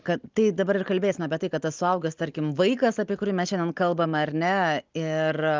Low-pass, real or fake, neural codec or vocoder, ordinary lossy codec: 7.2 kHz; real; none; Opus, 24 kbps